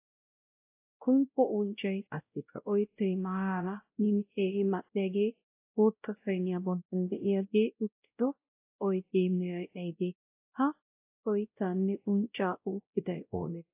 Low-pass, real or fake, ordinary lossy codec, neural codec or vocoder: 3.6 kHz; fake; AAC, 32 kbps; codec, 16 kHz, 0.5 kbps, X-Codec, WavLM features, trained on Multilingual LibriSpeech